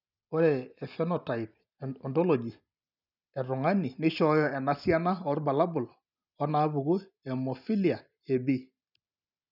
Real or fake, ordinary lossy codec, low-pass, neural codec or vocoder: real; none; 5.4 kHz; none